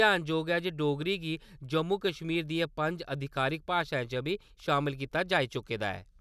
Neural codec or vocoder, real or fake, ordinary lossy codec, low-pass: none; real; none; 14.4 kHz